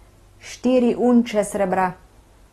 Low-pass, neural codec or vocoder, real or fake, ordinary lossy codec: 19.8 kHz; none; real; AAC, 32 kbps